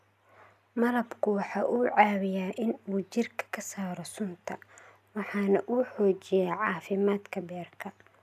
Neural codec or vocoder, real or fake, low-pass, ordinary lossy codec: none; real; 14.4 kHz; none